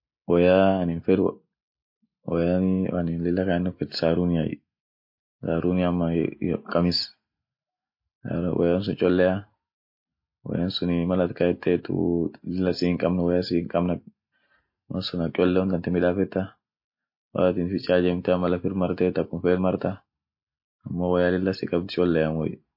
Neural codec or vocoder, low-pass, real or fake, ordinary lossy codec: none; 5.4 kHz; real; MP3, 32 kbps